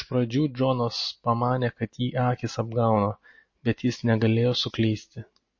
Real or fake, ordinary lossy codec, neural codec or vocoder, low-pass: real; MP3, 32 kbps; none; 7.2 kHz